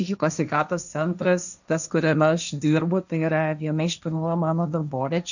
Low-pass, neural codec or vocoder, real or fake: 7.2 kHz; codec, 16 kHz, 1.1 kbps, Voila-Tokenizer; fake